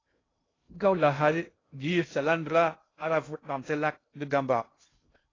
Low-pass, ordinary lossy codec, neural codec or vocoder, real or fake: 7.2 kHz; AAC, 32 kbps; codec, 16 kHz in and 24 kHz out, 0.6 kbps, FocalCodec, streaming, 2048 codes; fake